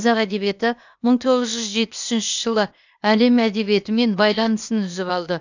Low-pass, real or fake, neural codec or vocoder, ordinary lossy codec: 7.2 kHz; fake; codec, 16 kHz, 0.8 kbps, ZipCodec; none